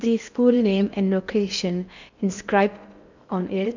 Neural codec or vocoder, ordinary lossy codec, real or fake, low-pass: codec, 16 kHz in and 24 kHz out, 0.6 kbps, FocalCodec, streaming, 4096 codes; none; fake; 7.2 kHz